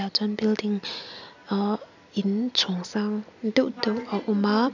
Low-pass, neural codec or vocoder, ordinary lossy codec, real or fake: 7.2 kHz; none; none; real